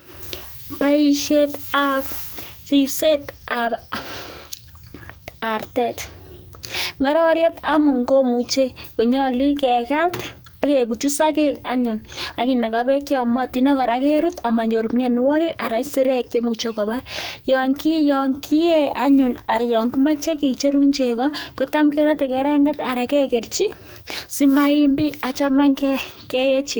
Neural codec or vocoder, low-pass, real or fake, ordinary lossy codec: codec, 44.1 kHz, 2.6 kbps, SNAC; none; fake; none